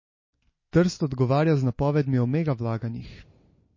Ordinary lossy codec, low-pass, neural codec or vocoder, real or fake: MP3, 32 kbps; 7.2 kHz; none; real